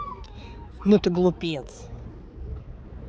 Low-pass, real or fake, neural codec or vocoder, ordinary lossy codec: none; fake; codec, 16 kHz, 4 kbps, X-Codec, HuBERT features, trained on balanced general audio; none